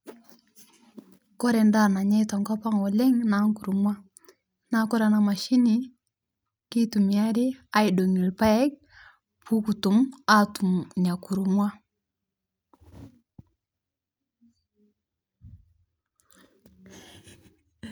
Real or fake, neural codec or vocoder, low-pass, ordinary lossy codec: real; none; none; none